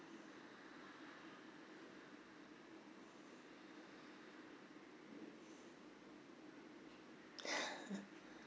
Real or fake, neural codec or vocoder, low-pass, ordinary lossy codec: real; none; none; none